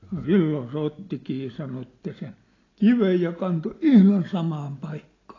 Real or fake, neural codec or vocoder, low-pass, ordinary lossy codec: real; none; 7.2 kHz; AAC, 32 kbps